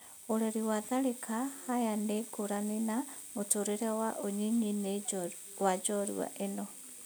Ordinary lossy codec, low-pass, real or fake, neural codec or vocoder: none; none; real; none